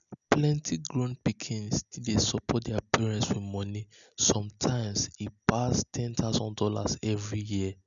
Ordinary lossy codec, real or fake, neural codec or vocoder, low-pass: none; real; none; 7.2 kHz